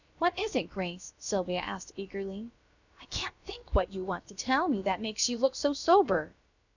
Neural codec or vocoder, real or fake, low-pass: codec, 16 kHz, about 1 kbps, DyCAST, with the encoder's durations; fake; 7.2 kHz